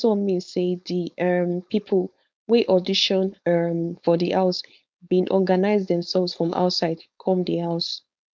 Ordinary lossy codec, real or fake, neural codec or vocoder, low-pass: none; fake; codec, 16 kHz, 4.8 kbps, FACodec; none